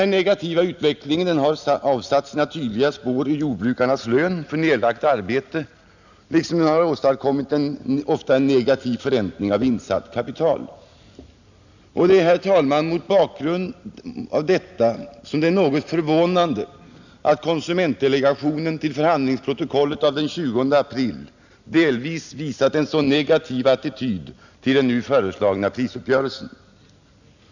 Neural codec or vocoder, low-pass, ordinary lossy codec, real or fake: none; 7.2 kHz; none; real